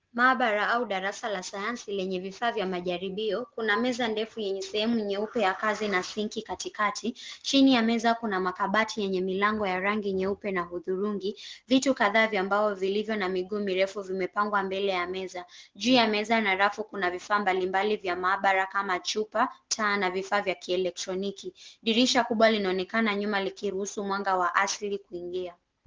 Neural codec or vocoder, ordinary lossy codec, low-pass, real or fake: none; Opus, 16 kbps; 7.2 kHz; real